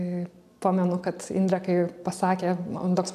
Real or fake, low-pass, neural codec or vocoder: real; 14.4 kHz; none